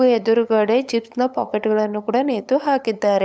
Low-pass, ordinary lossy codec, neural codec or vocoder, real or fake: none; none; codec, 16 kHz, 8 kbps, FunCodec, trained on LibriTTS, 25 frames a second; fake